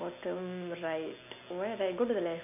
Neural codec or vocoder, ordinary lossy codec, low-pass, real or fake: none; none; 3.6 kHz; real